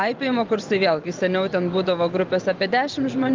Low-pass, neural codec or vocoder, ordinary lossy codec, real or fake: 7.2 kHz; vocoder, 24 kHz, 100 mel bands, Vocos; Opus, 32 kbps; fake